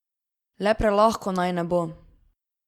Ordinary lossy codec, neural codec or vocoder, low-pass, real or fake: Opus, 64 kbps; none; 19.8 kHz; real